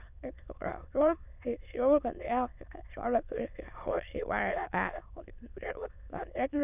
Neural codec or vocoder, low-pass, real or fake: autoencoder, 22.05 kHz, a latent of 192 numbers a frame, VITS, trained on many speakers; 3.6 kHz; fake